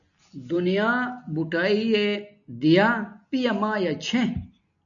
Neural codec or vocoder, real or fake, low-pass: none; real; 7.2 kHz